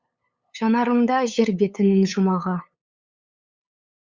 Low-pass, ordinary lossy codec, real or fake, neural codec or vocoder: 7.2 kHz; Opus, 64 kbps; fake; codec, 16 kHz, 8 kbps, FunCodec, trained on LibriTTS, 25 frames a second